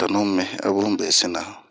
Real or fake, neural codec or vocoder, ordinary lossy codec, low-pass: real; none; none; none